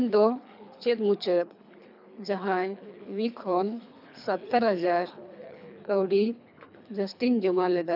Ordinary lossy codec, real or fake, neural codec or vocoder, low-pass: none; fake; codec, 24 kHz, 3 kbps, HILCodec; 5.4 kHz